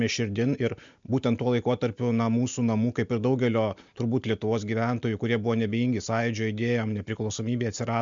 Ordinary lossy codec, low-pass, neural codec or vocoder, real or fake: MP3, 64 kbps; 7.2 kHz; none; real